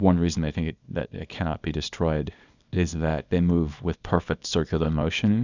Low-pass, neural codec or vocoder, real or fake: 7.2 kHz; codec, 24 kHz, 0.9 kbps, WavTokenizer, small release; fake